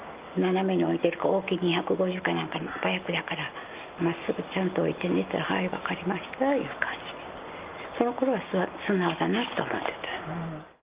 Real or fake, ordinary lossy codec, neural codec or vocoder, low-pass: real; Opus, 32 kbps; none; 3.6 kHz